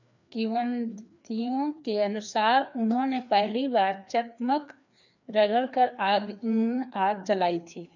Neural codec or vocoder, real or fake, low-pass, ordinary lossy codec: codec, 16 kHz, 2 kbps, FreqCodec, larger model; fake; 7.2 kHz; none